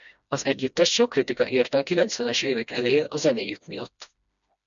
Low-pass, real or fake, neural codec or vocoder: 7.2 kHz; fake; codec, 16 kHz, 1 kbps, FreqCodec, smaller model